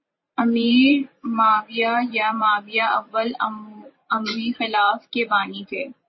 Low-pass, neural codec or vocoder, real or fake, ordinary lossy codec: 7.2 kHz; vocoder, 44.1 kHz, 128 mel bands every 512 samples, BigVGAN v2; fake; MP3, 24 kbps